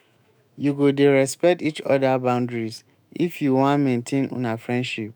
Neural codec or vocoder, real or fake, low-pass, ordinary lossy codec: autoencoder, 48 kHz, 128 numbers a frame, DAC-VAE, trained on Japanese speech; fake; none; none